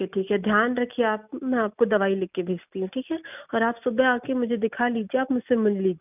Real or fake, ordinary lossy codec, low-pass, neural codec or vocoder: real; none; 3.6 kHz; none